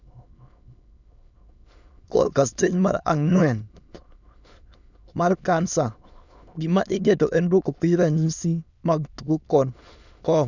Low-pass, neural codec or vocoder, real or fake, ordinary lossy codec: 7.2 kHz; autoencoder, 22.05 kHz, a latent of 192 numbers a frame, VITS, trained on many speakers; fake; none